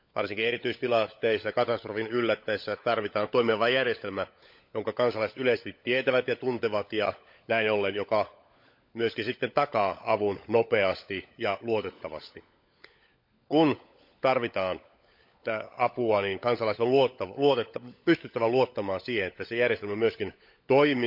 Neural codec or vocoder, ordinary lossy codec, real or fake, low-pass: codec, 16 kHz, 16 kbps, FunCodec, trained on LibriTTS, 50 frames a second; MP3, 48 kbps; fake; 5.4 kHz